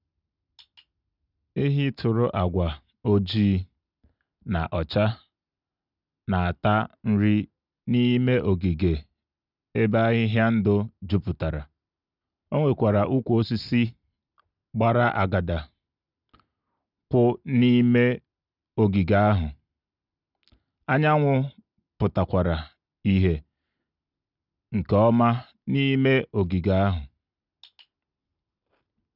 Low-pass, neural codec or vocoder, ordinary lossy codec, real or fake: 5.4 kHz; none; none; real